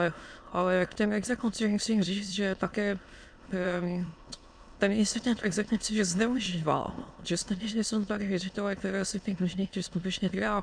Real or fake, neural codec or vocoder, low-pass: fake; autoencoder, 22.05 kHz, a latent of 192 numbers a frame, VITS, trained on many speakers; 9.9 kHz